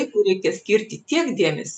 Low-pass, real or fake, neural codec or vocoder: 9.9 kHz; real; none